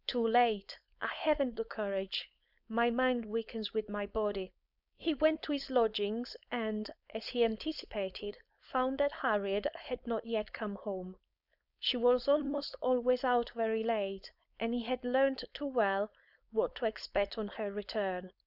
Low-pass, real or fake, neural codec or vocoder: 5.4 kHz; fake; codec, 16 kHz, 4.8 kbps, FACodec